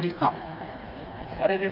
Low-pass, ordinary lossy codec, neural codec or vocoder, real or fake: 5.4 kHz; none; codec, 16 kHz, 2 kbps, FreqCodec, smaller model; fake